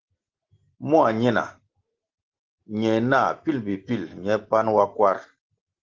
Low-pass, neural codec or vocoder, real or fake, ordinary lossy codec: 7.2 kHz; none; real; Opus, 16 kbps